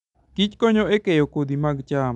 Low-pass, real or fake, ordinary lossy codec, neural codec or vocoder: 10.8 kHz; real; none; none